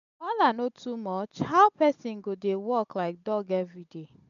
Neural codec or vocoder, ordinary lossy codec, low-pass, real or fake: none; none; 7.2 kHz; real